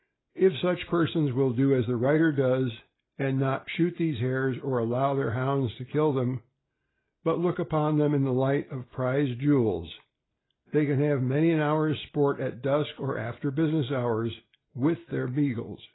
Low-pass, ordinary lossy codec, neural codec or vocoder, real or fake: 7.2 kHz; AAC, 16 kbps; none; real